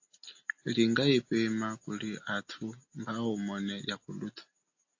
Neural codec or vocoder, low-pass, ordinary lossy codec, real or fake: none; 7.2 kHz; AAC, 48 kbps; real